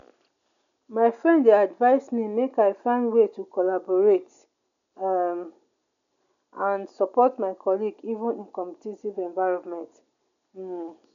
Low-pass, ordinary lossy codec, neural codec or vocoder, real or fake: 7.2 kHz; none; none; real